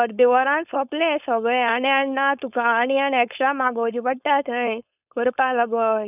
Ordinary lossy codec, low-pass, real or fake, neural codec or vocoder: none; 3.6 kHz; fake; codec, 16 kHz, 4.8 kbps, FACodec